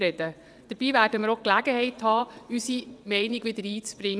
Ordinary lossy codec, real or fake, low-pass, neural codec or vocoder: none; fake; none; vocoder, 22.05 kHz, 80 mel bands, WaveNeXt